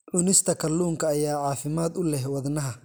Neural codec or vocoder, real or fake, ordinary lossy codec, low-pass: none; real; none; none